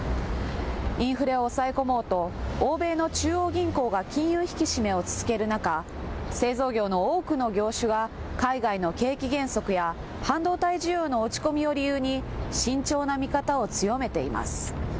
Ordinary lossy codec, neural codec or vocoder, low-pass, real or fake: none; none; none; real